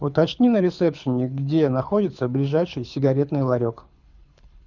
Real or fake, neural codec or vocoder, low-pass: fake; codec, 24 kHz, 6 kbps, HILCodec; 7.2 kHz